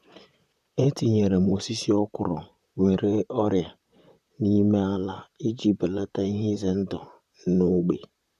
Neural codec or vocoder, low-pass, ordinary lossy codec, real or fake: vocoder, 44.1 kHz, 128 mel bands, Pupu-Vocoder; 14.4 kHz; none; fake